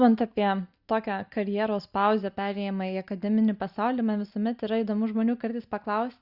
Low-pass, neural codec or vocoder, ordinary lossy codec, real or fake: 5.4 kHz; none; Opus, 64 kbps; real